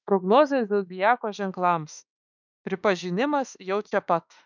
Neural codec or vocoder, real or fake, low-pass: autoencoder, 48 kHz, 32 numbers a frame, DAC-VAE, trained on Japanese speech; fake; 7.2 kHz